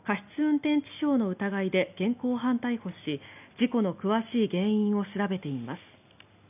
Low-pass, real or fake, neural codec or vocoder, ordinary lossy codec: 3.6 kHz; real; none; none